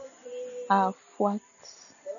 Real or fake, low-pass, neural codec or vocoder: real; 7.2 kHz; none